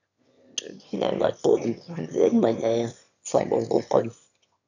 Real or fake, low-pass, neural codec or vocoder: fake; 7.2 kHz; autoencoder, 22.05 kHz, a latent of 192 numbers a frame, VITS, trained on one speaker